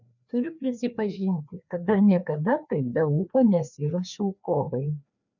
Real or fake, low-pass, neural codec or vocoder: fake; 7.2 kHz; codec, 16 kHz, 2 kbps, FreqCodec, larger model